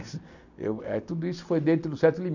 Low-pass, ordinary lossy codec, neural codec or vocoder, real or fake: 7.2 kHz; none; none; real